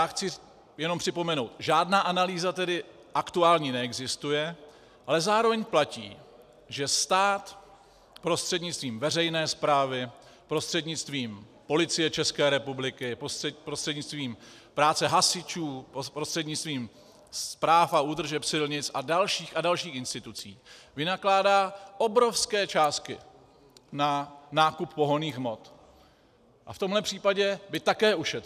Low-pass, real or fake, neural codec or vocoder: 14.4 kHz; real; none